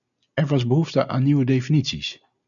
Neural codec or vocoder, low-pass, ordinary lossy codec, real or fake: none; 7.2 kHz; MP3, 96 kbps; real